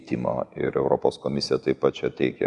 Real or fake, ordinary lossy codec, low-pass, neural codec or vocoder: real; Opus, 64 kbps; 10.8 kHz; none